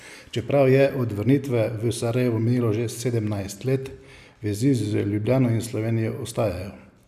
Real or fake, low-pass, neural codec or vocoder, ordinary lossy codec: real; 14.4 kHz; none; none